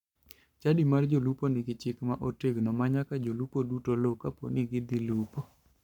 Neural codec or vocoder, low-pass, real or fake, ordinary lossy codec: codec, 44.1 kHz, 7.8 kbps, Pupu-Codec; 19.8 kHz; fake; none